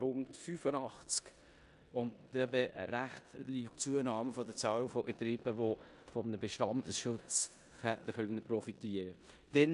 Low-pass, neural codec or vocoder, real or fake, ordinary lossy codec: 10.8 kHz; codec, 16 kHz in and 24 kHz out, 0.9 kbps, LongCat-Audio-Codec, four codebook decoder; fake; AAC, 96 kbps